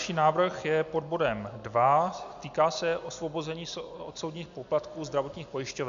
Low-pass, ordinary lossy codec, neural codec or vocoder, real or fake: 7.2 kHz; MP3, 64 kbps; none; real